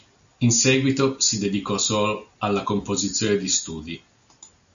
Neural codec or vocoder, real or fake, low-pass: none; real; 7.2 kHz